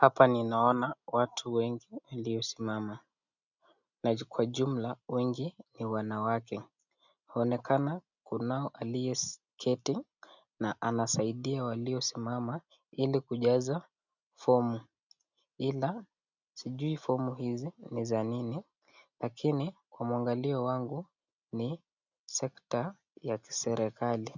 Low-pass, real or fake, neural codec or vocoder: 7.2 kHz; real; none